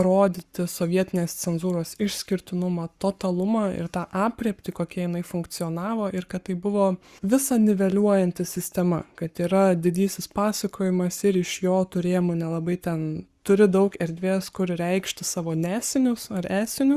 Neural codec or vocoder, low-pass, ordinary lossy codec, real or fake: codec, 44.1 kHz, 7.8 kbps, Pupu-Codec; 14.4 kHz; Opus, 64 kbps; fake